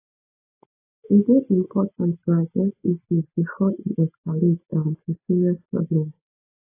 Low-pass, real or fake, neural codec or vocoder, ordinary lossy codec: 3.6 kHz; real; none; AAC, 24 kbps